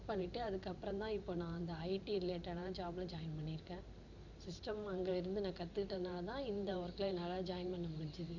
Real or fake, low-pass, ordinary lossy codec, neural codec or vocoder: fake; 7.2 kHz; none; vocoder, 44.1 kHz, 128 mel bands every 512 samples, BigVGAN v2